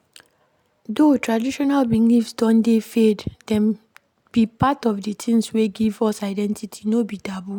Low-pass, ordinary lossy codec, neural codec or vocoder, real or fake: 19.8 kHz; none; none; real